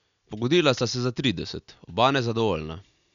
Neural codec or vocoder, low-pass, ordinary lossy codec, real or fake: none; 7.2 kHz; none; real